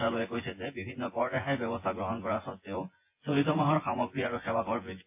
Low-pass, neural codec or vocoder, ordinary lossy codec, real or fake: 3.6 kHz; vocoder, 24 kHz, 100 mel bands, Vocos; MP3, 24 kbps; fake